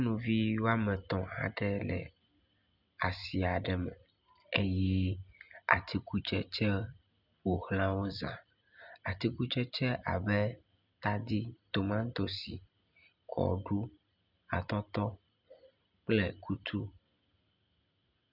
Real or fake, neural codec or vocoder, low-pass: real; none; 5.4 kHz